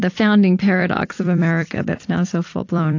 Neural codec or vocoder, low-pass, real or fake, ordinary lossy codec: vocoder, 44.1 kHz, 128 mel bands every 256 samples, BigVGAN v2; 7.2 kHz; fake; AAC, 48 kbps